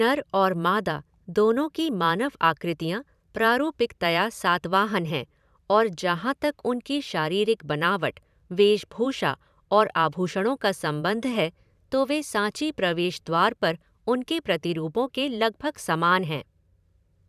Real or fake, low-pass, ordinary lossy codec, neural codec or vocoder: fake; 14.4 kHz; none; vocoder, 44.1 kHz, 128 mel bands every 512 samples, BigVGAN v2